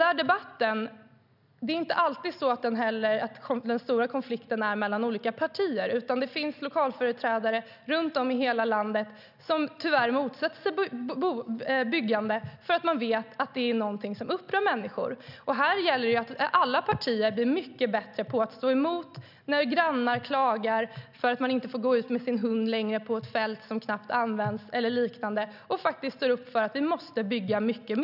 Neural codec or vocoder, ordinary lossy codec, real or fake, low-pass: none; none; real; 5.4 kHz